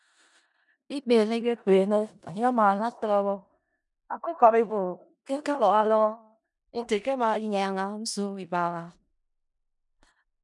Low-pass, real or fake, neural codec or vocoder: 10.8 kHz; fake; codec, 16 kHz in and 24 kHz out, 0.4 kbps, LongCat-Audio-Codec, four codebook decoder